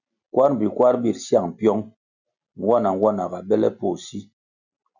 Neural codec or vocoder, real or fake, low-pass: none; real; 7.2 kHz